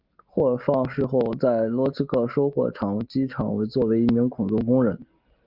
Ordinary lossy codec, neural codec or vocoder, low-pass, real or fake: Opus, 32 kbps; none; 5.4 kHz; real